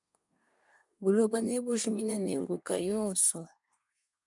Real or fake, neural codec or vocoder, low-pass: fake; codec, 24 kHz, 1 kbps, SNAC; 10.8 kHz